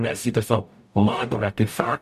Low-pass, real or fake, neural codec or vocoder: 14.4 kHz; fake; codec, 44.1 kHz, 0.9 kbps, DAC